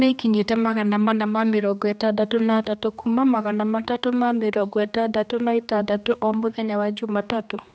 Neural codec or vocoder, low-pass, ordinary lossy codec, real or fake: codec, 16 kHz, 2 kbps, X-Codec, HuBERT features, trained on general audio; none; none; fake